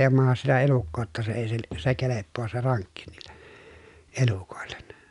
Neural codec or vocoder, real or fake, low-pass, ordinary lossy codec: none; real; 10.8 kHz; none